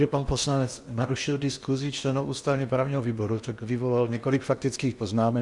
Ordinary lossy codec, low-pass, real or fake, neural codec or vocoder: Opus, 64 kbps; 10.8 kHz; fake; codec, 16 kHz in and 24 kHz out, 0.8 kbps, FocalCodec, streaming, 65536 codes